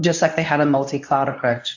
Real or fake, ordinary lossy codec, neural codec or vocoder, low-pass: fake; AAC, 48 kbps; codec, 24 kHz, 0.9 kbps, WavTokenizer, medium speech release version 1; 7.2 kHz